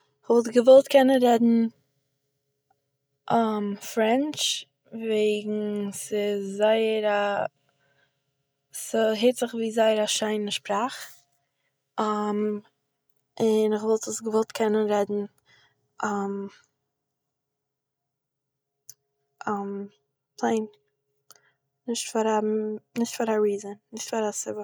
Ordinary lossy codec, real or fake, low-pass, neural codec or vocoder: none; real; none; none